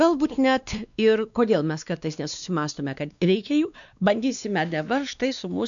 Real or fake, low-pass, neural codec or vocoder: fake; 7.2 kHz; codec, 16 kHz, 2 kbps, X-Codec, WavLM features, trained on Multilingual LibriSpeech